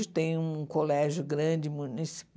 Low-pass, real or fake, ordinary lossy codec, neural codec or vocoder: none; real; none; none